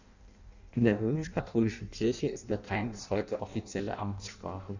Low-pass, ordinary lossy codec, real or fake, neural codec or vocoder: 7.2 kHz; none; fake; codec, 16 kHz in and 24 kHz out, 0.6 kbps, FireRedTTS-2 codec